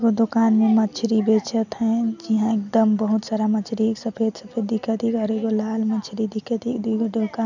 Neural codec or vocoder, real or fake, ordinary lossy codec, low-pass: none; real; none; 7.2 kHz